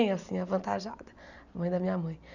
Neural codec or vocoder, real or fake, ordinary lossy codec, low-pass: none; real; none; 7.2 kHz